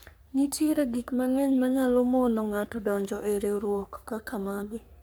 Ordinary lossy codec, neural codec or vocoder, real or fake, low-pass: none; codec, 44.1 kHz, 3.4 kbps, Pupu-Codec; fake; none